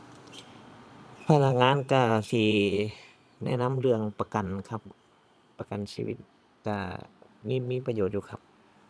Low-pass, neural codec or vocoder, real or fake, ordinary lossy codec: none; vocoder, 22.05 kHz, 80 mel bands, Vocos; fake; none